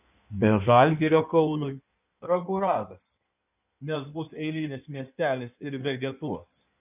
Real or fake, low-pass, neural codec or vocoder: fake; 3.6 kHz; codec, 16 kHz in and 24 kHz out, 1.1 kbps, FireRedTTS-2 codec